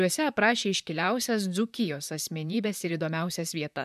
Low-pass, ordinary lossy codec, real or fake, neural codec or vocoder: 14.4 kHz; MP3, 96 kbps; fake; vocoder, 44.1 kHz, 128 mel bands, Pupu-Vocoder